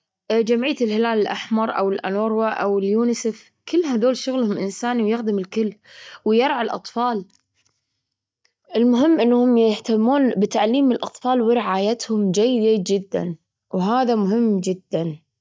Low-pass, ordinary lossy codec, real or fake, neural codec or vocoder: none; none; real; none